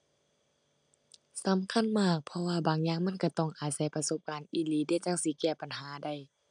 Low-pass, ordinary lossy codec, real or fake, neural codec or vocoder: 9.9 kHz; none; real; none